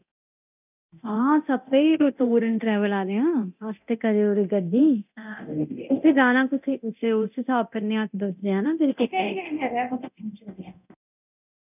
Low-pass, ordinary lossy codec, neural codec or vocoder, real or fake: 3.6 kHz; none; codec, 24 kHz, 0.9 kbps, DualCodec; fake